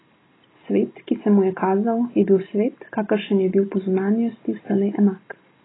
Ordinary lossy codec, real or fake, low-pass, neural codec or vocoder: AAC, 16 kbps; real; 7.2 kHz; none